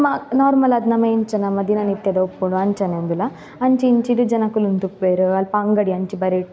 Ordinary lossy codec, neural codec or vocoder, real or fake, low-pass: none; none; real; none